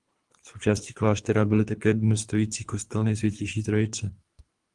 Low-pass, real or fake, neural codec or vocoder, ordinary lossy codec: 10.8 kHz; fake; codec, 24 kHz, 3 kbps, HILCodec; Opus, 24 kbps